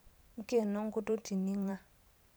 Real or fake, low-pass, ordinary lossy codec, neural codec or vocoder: fake; none; none; vocoder, 44.1 kHz, 128 mel bands every 512 samples, BigVGAN v2